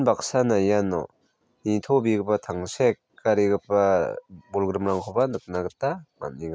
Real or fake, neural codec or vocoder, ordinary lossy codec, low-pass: real; none; none; none